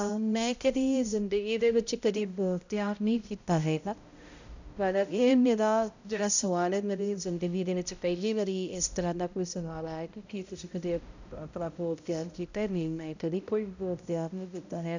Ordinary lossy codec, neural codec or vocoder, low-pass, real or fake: none; codec, 16 kHz, 0.5 kbps, X-Codec, HuBERT features, trained on balanced general audio; 7.2 kHz; fake